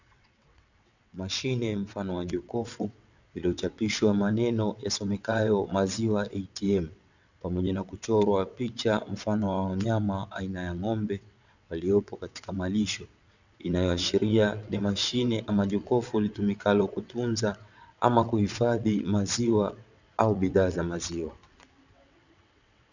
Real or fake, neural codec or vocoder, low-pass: fake; vocoder, 22.05 kHz, 80 mel bands, WaveNeXt; 7.2 kHz